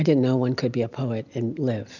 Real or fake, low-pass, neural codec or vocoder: real; 7.2 kHz; none